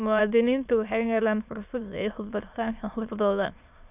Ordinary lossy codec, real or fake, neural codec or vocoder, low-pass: none; fake; autoencoder, 22.05 kHz, a latent of 192 numbers a frame, VITS, trained on many speakers; 3.6 kHz